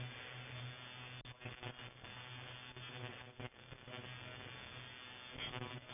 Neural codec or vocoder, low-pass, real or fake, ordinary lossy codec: none; 3.6 kHz; real; none